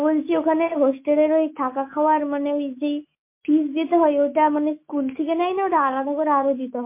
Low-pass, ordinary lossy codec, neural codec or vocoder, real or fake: 3.6 kHz; MP3, 24 kbps; autoencoder, 48 kHz, 128 numbers a frame, DAC-VAE, trained on Japanese speech; fake